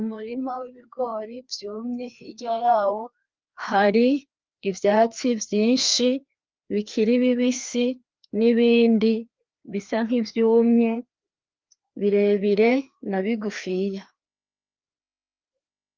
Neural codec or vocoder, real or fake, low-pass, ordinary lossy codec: codec, 16 kHz, 2 kbps, FreqCodec, larger model; fake; 7.2 kHz; Opus, 32 kbps